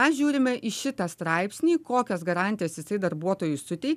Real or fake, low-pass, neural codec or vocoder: real; 14.4 kHz; none